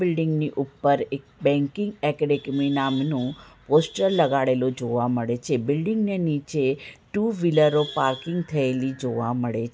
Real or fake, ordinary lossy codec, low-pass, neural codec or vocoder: real; none; none; none